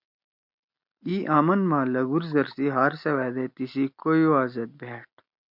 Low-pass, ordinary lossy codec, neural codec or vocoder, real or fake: 5.4 kHz; MP3, 48 kbps; none; real